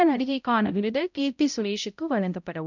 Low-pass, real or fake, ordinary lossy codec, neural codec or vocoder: 7.2 kHz; fake; none; codec, 16 kHz, 0.5 kbps, X-Codec, HuBERT features, trained on balanced general audio